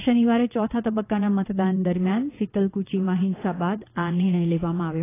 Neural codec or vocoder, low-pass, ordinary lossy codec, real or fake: vocoder, 22.05 kHz, 80 mel bands, WaveNeXt; 3.6 kHz; AAC, 16 kbps; fake